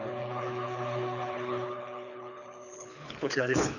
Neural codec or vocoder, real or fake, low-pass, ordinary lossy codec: codec, 24 kHz, 6 kbps, HILCodec; fake; 7.2 kHz; none